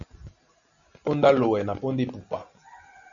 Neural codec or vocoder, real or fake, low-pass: none; real; 7.2 kHz